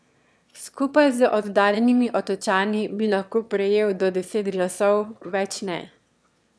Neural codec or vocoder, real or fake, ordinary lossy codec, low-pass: autoencoder, 22.05 kHz, a latent of 192 numbers a frame, VITS, trained on one speaker; fake; none; none